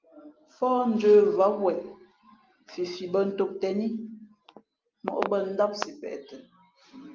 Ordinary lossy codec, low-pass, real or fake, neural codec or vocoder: Opus, 32 kbps; 7.2 kHz; real; none